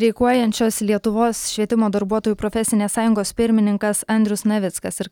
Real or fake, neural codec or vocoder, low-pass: real; none; 19.8 kHz